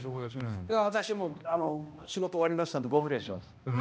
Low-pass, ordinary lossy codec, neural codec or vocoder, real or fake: none; none; codec, 16 kHz, 1 kbps, X-Codec, HuBERT features, trained on balanced general audio; fake